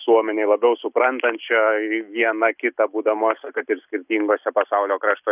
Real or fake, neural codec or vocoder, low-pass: real; none; 3.6 kHz